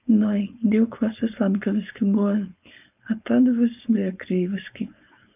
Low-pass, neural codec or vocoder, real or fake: 3.6 kHz; codec, 16 kHz, 4.8 kbps, FACodec; fake